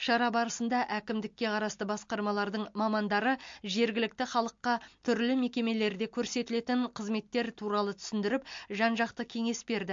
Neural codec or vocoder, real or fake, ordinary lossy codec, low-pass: none; real; MP3, 48 kbps; 7.2 kHz